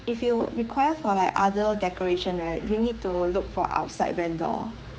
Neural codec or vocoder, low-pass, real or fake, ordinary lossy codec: codec, 16 kHz, 4 kbps, X-Codec, HuBERT features, trained on general audio; none; fake; none